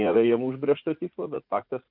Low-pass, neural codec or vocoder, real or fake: 5.4 kHz; vocoder, 44.1 kHz, 80 mel bands, Vocos; fake